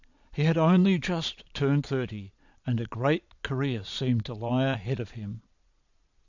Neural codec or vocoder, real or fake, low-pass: vocoder, 22.05 kHz, 80 mel bands, Vocos; fake; 7.2 kHz